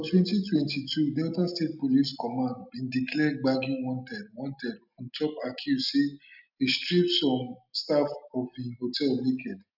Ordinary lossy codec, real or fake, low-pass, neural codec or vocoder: none; real; 5.4 kHz; none